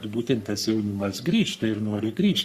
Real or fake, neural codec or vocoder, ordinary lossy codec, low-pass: fake; codec, 44.1 kHz, 3.4 kbps, Pupu-Codec; Opus, 64 kbps; 14.4 kHz